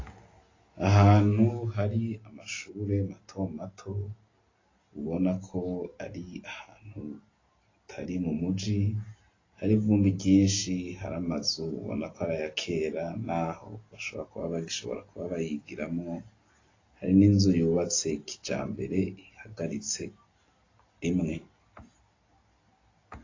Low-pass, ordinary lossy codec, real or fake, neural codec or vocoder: 7.2 kHz; AAC, 32 kbps; real; none